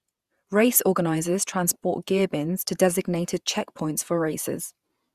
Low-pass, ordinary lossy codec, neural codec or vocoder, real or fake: 14.4 kHz; Opus, 64 kbps; vocoder, 48 kHz, 128 mel bands, Vocos; fake